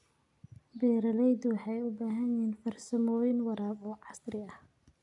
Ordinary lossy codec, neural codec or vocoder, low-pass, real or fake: none; none; 10.8 kHz; real